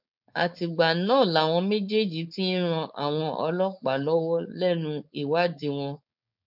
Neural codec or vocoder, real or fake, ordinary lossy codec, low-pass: codec, 16 kHz, 4.8 kbps, FACodec; fake; none; 5.4 kHz